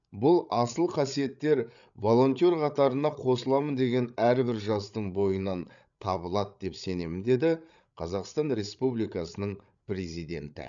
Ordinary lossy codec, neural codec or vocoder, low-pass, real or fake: none; codec, 16 kHz, 8 kbps, FreqCodec, larger model; 7.2 kHz; fake